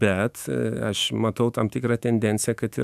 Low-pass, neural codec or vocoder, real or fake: 14.4 kHz; autoencoder, 48 kHz, 128 numbers a frame, DAC-VAE, trained on Japanese speech; fake